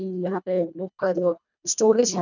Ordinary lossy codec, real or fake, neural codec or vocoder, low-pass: none; fake; codec, 24 kHz, 1.5 kbps, HILCodec; 7.2 kHz